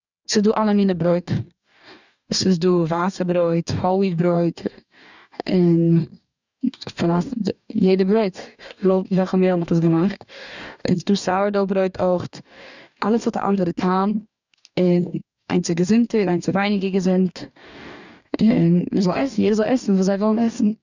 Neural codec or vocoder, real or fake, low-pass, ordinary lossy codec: codec, 44.1 kHz, 2.6 kbps, DAC; fake; 7.2 kHz; none